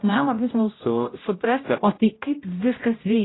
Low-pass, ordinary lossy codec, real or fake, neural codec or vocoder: 7.2 kHz; AAC, 16 kbps; fake; codec, 16 kHz, 0.5 kbps, X-Codec, HuBERT features, trained on general audio